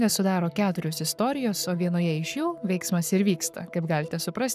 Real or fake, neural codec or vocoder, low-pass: fake; codec, 44.1 kHz, 7.8 kbps, Pupu-Codec; 14.4 kHz